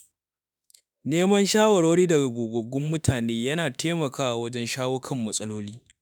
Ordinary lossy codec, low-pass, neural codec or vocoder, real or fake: none; none; autoencoder, 48 kHz, 32 numbers a frame, DAC-VAE, trained on Japanese speech; fake